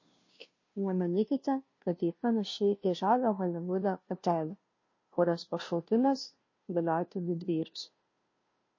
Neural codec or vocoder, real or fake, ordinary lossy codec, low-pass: codec, 16 kHz, 0.5 kbps, FunCodec, trained on Chinese and English, 25 frames a second; fake; MP3, 32 kbps; 7.2 kHz